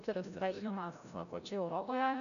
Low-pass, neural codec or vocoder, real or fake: 7.2 kHz; codec, 16 kHz, 0.5 kbps, FreqCodec, larger model; fake